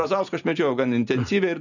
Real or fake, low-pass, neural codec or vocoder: fake; 7.2 kHz; vocoder, 44.1 kHz, 128 mel bands every 512 samples, BigVGAN v2